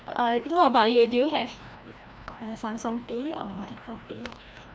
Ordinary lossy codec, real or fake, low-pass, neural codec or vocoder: none; fake; none; codec, 16 kHz, 1 kbps, FreqCodec, larger model